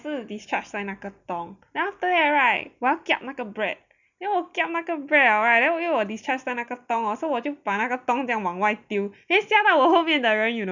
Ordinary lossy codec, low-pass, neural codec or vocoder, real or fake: none; 7.2 kHz; none; real